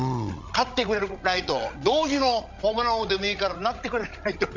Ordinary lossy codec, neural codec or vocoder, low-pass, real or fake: MP3, 64 kbps; codec, 16 kHz, 16 kbps, FreqCodec, larger model; 7.2 kHz; fake